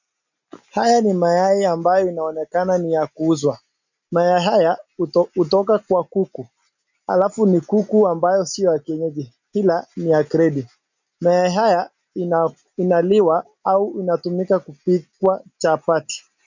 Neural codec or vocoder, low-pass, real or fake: none; 7.2 kHz; real